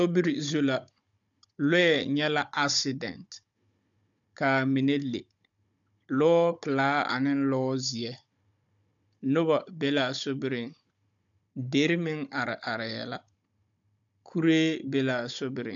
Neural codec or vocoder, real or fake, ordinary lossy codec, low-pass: codec, 16 kHz, 16 kbps, FunCodec, trained on Chinese and English, 50 frames a second; fake; AAC, 64 kbps; 7.2 kHz